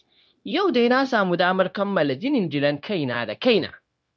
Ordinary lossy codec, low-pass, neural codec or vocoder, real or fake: none; none; codec, 16 kHz, 0.9 kbps, LongCat-Audio-Codec; fake